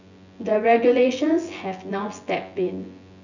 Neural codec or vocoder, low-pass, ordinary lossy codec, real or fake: vocoder, 24 kHz, 100 mel bands, Vocos; 7.2 kHz; none; fake